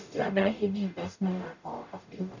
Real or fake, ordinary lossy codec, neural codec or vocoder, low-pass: fake; none; codec, 44.1 kHz, 0.9 kbps, DAC; 7.2 kHz